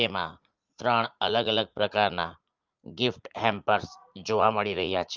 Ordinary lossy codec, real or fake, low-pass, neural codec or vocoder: Opus, 24 kbps; fake; 7.2 kHz; vocoder, 44.1 kHz, 80 mel bands, Vocos